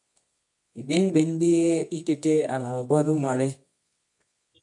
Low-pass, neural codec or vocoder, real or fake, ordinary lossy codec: 10.8 kHz; codec, 24 kHz, 0.9 kbps, WavTokenizer, medium music audio release; fake; MP3, 64 kbps